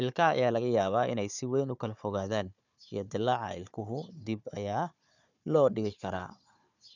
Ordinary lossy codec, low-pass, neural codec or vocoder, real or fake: none; 7.2 kHz; codec, 16 kHz, 4 kbps, FunCodec, trained on Chinese and English, 50 frames a second; fake